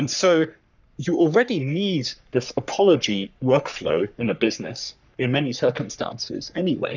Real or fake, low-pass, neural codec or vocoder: fake; 7.2 kHz; codec, 44.1 kHz, 3.4 kbps, Pupu-Codec